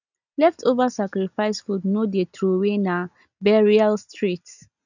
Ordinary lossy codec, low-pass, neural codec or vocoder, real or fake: none; 7.2 kHz; none; real